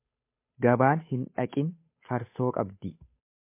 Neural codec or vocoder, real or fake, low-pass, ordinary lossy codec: codec, 16 kHz, 8 kbps, FunCodec, trained on Chinese and English, 25 frames a second; fake; 3.6 kHz; MP3, 24 kbps